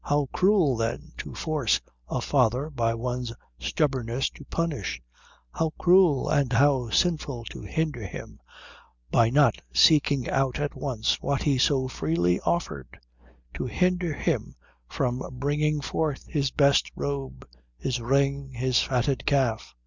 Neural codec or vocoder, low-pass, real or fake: none; 7.2 kHz; real